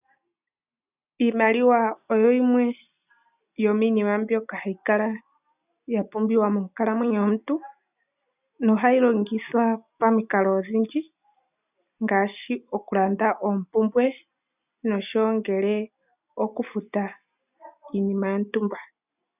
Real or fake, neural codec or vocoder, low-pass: real; none; 3.6 kHz